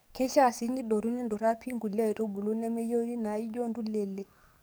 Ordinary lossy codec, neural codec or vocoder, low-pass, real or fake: none; codec, 44.1 kHz, 7.8 kbps, DAC; none; fake